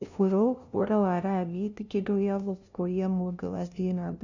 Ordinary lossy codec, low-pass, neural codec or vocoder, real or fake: none; 7.2 kHz; codec, 16 kHz, 0.5 kbps, FunCodec, trained on LibriTTS, 25 frames a second; fake